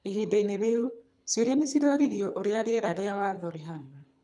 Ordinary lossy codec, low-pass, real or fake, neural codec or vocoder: none; 10.8 kHz; fake; codec, 24 kHz, 3 kbps, HILCodec